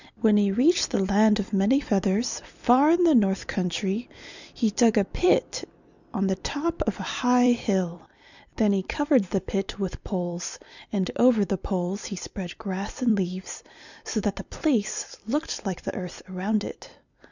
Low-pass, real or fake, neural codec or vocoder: 7.2 kHz; fake; vocoder, 44.1 kHz, 128 mel bands every 256 samples, BigVGAN v2